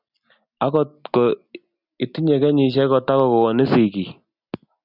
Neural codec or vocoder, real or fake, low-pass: none; real; 5.4 kHz